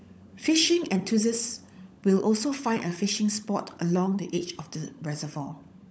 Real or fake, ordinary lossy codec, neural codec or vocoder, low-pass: fake; none; codec, 16 kHz, 16 kbps, FunCodec, trained on LibriTTS, 50 frames a second; none